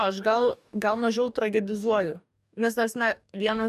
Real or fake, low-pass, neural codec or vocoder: fake; 14.4 kHz; codec, 44.1 kHz, 2.6 kbps, DAC